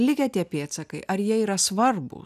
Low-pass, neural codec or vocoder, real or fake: 14.4 kHz; none; real